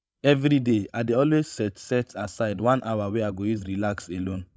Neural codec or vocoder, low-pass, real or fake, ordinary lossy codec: codec, 16 kHz, 16 kbps, FreqCodec, larger model; none; fake; none